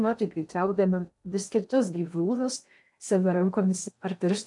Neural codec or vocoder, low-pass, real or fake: codec, 16 kHz in and 24 kHz out, 0.6 kbps, FocalCodec, streaming, 2048 codes; 10.8 kHz; fake